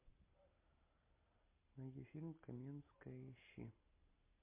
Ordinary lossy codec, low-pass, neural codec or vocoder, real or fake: MP3, 24 kbps; 3.6 kHz; none; real